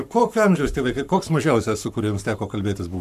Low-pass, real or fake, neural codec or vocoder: 14.4 kHz; fake; codec, 44.1 kHz, 7.8 kbps, Pupu-Codec